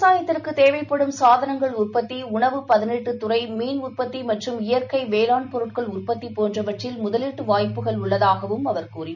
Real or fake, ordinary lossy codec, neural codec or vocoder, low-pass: real; none; none; 7.2 kHz